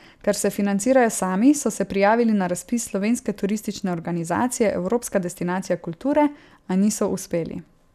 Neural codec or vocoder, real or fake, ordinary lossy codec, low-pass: none; real; none; 14.4 kHz